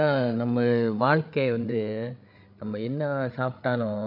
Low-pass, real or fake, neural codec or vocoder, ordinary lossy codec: 5.4 kHz; fake; codec, 16 kHz, 8 kbps, FreqCodec, larger model; none